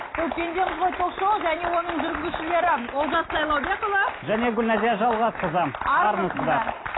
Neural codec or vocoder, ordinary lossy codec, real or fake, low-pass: none; AAC, 16 kbps; real; 7.2 kHz